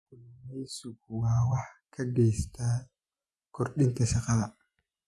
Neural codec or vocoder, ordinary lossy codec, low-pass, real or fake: vocoder, 24 kHz, 100 mel bands, Vocos; none; none; fake